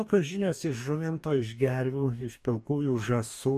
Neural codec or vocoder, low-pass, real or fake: codec, 44.1 kHz, 2.6 kbps, DAC; 14.4 kHz; fake